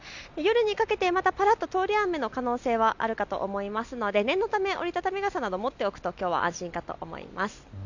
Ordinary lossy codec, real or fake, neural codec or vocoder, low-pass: none; real; none; 7.2 kHz